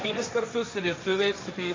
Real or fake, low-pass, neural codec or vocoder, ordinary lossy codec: fake; 7.2 kHz; codec, 16 kHz, 1.1 kbps, Voila-Tokenizer; AAC, 32 kbps